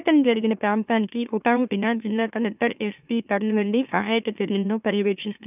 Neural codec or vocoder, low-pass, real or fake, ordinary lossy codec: autoencoder, 44.1 kHz, a latent of 192 numbers a frame, MeloTTS; 3.6 kHz; fake; none